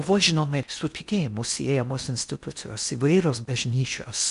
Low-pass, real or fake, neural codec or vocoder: 10.8 kHz; fake; codec, 16 kHz in and 24 kHz out, 0.6 kbps, FocalCodec, streaming, 4096 codes